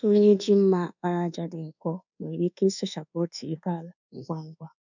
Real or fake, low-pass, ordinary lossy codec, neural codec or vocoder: fake; 7.2 kHz; none; codec, 24 kHz, 1.2 kbps, DualCodec